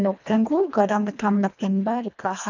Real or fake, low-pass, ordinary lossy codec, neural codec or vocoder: fake; 7.2 kHz; none; codec, 24 kHz, 1.5 kbps, HILCodec